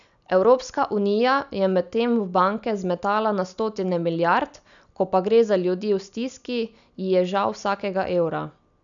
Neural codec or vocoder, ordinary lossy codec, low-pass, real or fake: none; none; 7.2 kHz; real